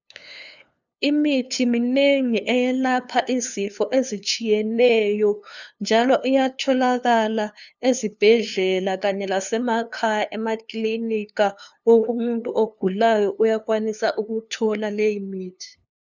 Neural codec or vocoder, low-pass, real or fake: codec, 16 kHz, 2 kbps, FunCodec, trained on LibriTTS, 25 frames a second; 7.2 kHz; fake